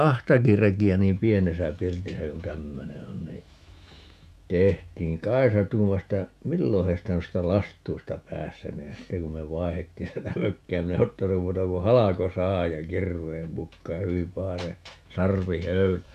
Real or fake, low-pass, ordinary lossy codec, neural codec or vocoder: fake; 14.4 kHz; none; autoencoder, 48 kHz, 128 numbers a frame, DAC-VAE, trained on Japanese speech